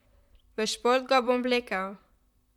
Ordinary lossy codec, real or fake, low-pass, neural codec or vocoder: none; fake; 19.8 kHz; vocoder, 44.1 kHz, 128 mel bands, Pupu-Vocoder